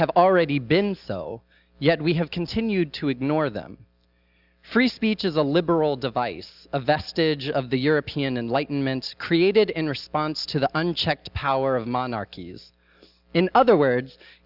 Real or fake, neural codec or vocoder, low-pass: real; none; 5.4 kHz